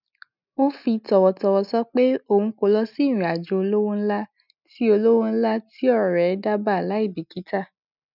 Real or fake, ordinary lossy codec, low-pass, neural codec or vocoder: real; none; 5.4 kHz; none